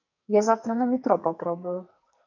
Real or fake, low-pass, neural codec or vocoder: fake; 7.2 kHz; codec, 44.1 kHz, 2.6 kbps, SNAC